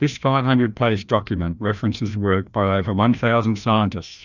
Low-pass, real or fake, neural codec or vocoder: 7.2 kHz; fake; codec, 16 kHz, 1 kbps, FreqCodec, larger model